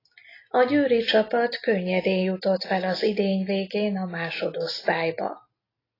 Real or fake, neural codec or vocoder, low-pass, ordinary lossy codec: real; none; 5.4 kHz; AAC, 24 kbps